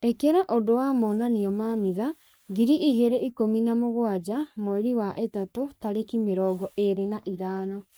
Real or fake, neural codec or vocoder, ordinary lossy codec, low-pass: fake; codec, 44.1 kHz, 3.4 kbps, Pupu-Codec; none; none